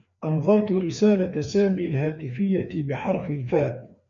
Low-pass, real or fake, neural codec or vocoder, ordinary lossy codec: 7.2 kHz; fake; codec, 16 kHz, 2 kbps, FreqCodec, larger model; MP3, 64 kbps